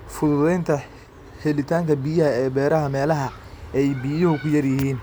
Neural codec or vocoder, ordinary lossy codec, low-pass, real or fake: none; none; none; real